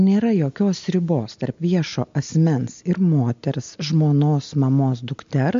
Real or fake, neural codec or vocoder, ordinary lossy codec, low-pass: real; none; MP3, 48 kbps; 7.2 kHz